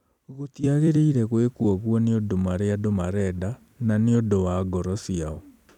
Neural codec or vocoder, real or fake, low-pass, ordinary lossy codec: vocoder, 44.1 kHz, 128 mel bands every 256 samples, BigVGAN v2; fake; 19.8 kHz; none